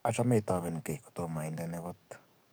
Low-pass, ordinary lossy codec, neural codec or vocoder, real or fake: none; none; codec, 44.1 kHz, 7.8 kbps, DAC; fake